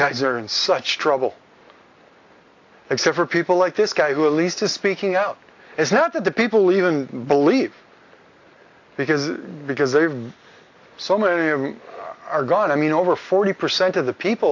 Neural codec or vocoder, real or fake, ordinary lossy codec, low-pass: none; real; AAC, 48 kbps; 7.2 kHz